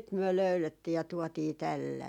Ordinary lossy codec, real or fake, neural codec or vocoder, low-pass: none; real; none; 19.8 kHz